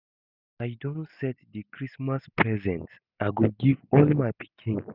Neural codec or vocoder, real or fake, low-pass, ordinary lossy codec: none; real; 5.4 kHz; none